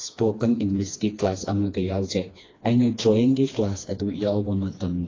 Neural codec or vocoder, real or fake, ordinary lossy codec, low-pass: codec, 16 kHz, 2 kbps, FreqCodec, smaller model; fake; AAC, 32 kbps; 7.2 kHz